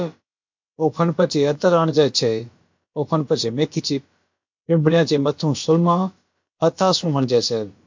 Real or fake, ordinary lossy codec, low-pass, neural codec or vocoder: fake; MP3, 48 kbps; 7.2 kHz; codec, 16 kHz, about 1 kbps, DyCAST, with the encoder's durations